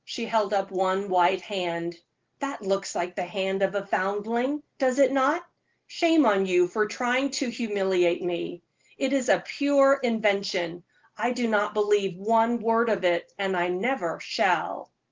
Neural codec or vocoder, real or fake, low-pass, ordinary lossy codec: none; real; 7.2 kHz; Opus, 16 kbps